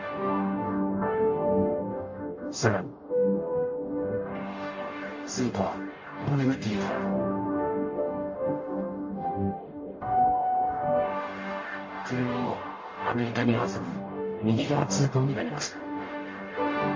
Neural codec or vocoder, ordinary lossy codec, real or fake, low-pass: codec, 44.1 kHz, 0.9 kbps, DAC; MP3, 32 kbps; fake; 7.2 kHz